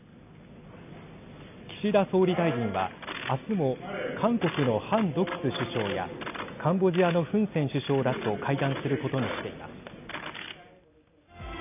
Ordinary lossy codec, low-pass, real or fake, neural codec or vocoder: none; 3.6 kHz; real; none